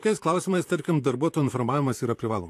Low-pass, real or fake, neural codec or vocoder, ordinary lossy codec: 14.4 kHz; fake; vocoder, 44.1 kHz, 128 mel bands, Pupu-Vocoder; AAC, 64 kbps